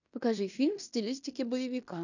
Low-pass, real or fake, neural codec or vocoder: 7.2 kHz; fake; codec, 16 kHz in and 24 kHz out, 0.9 kbps, LongCat-Audio-Codec, fine tuned four codebook decoder